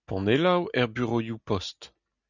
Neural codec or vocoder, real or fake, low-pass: none; real; 7.2 kHz